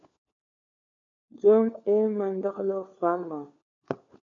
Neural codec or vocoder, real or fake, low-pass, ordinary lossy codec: codec, 16 kHz, 4 kbps, FunCodec, trained on LibriTTS, 50 frames a second; fake; 7.2 kHz; AAC, 64 kbps